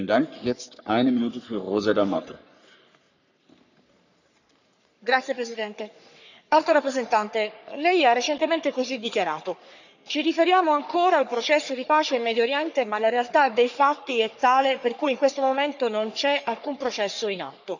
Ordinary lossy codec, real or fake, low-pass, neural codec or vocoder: none; fake; 7.2 kHz; codec, 44.1 kHz, 3.4 kbps, Pupu-Codec